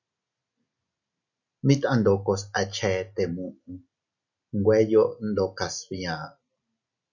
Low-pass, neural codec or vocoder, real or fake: 7.2 kHz; none; real